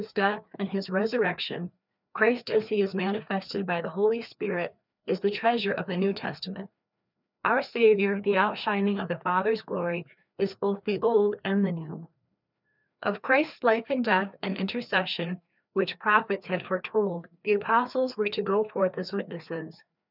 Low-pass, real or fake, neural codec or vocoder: 5.4 kHz; fake; codec, 16 kHz, 2 kbps, FreqCodec, larger model